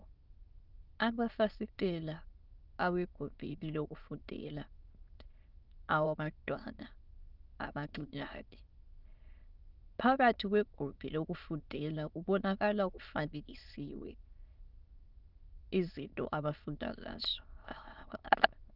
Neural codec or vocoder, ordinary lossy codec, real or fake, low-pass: autoencoder, 22.05 kHz, a latent of 192 numbers a frame, VITS, trained on many speakers; Opus, 24 kbps; fake; 5.4 kHz